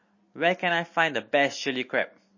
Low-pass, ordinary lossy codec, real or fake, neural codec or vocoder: 7.2 kHz; MP3, 32 kbps; real; none